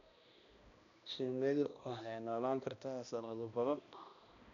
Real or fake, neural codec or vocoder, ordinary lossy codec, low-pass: fake; codec, 16 kHz, 1 kbps, X-Codec, HuBERT features, trained on balanced general audio; none; 7.2 kHz